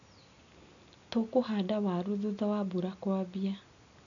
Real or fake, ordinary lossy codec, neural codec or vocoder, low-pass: real; none; none; 7.2 kHz